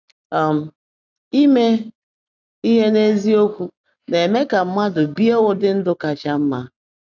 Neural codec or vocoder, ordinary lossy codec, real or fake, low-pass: none; none; real; 7.2 kHz